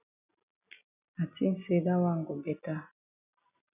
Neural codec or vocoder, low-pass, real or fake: none; 3.6 kHz; real